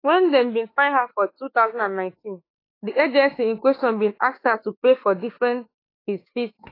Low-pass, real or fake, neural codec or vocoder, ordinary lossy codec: 5.4 kHz; fake; autoencoder, 48 kHz, 32 numbers a frame, DAC-VAE, trained on Japanese speech; AAC, 24 kbps